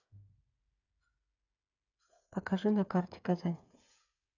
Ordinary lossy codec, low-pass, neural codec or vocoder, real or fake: none; 7.2 kHz; codec, 16 kHz, 8 kbps, FreqCodec, smaller model; fake